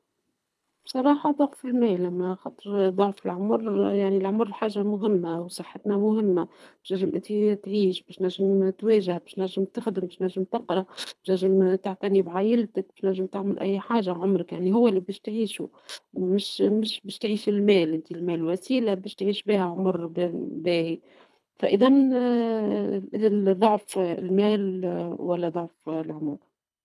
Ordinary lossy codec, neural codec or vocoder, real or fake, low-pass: none; codec, 24 kHz, 3 kbps, HILCodec; fake; none